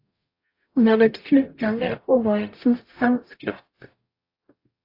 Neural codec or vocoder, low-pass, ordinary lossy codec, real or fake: codec, 44.1 kHz, 0.9 kbps, DAC; 5.4 kHz; AAC, 32 kbps; fake